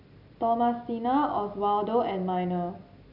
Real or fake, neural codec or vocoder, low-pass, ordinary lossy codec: real; none; 5.4 kHz; none